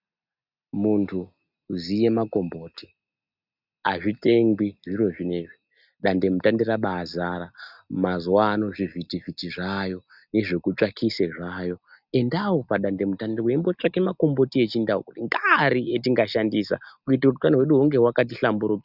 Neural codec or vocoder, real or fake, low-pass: none; real; 5.4 kHz